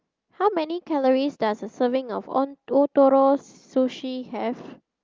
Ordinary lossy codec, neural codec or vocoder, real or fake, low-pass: Opus, 32 kbps; none; real; 7.2 kHz